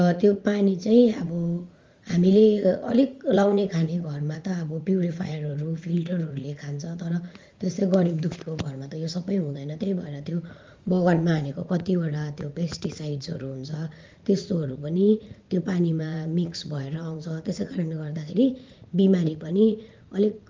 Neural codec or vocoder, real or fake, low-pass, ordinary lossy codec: vocoder, 44.1 kHz, 80 mel bands, Vocos; fake; 7.2 kHz; Opus, 32 kbps